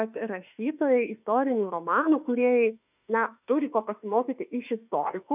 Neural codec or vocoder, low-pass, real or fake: autoencoder, 48 kHz, 32 numbers a frame, DAC-VAE, trained on Japanese speech; 3.6 kHz; fake